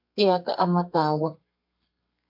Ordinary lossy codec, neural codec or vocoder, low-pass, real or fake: MP3, 48 kbps; codec, 32 kHz, 1.9 kbps, SNAC; 5.4 kHz; fake